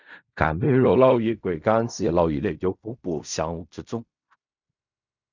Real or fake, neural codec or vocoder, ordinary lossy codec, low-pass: fake; codec, 16 kHz in and 24 kHz out, 0.4 kbps, LongCat-Audio-Codec, fine tuned four codebook decoder; none; 7.2 kHz